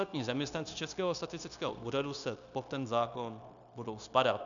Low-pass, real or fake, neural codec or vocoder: 7.2 kHz; fake; codec, 16 kHz, 0.9 kbps, LongCat-Audio-Codec